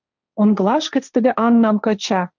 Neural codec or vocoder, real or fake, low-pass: codec, 16 kHz, 1.1 kbps, Voila-Tokenizer; fake; 7.2 kHz